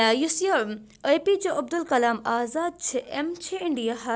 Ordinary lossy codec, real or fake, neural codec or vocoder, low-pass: none; real; none; none